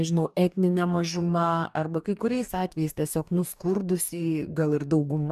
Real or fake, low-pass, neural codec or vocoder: fake; 14.4 kHz; codec, 44.1 kHz, 2.6 kbps, DAC